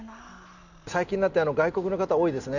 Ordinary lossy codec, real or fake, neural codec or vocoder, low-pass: none; real; none; 7.2 kHz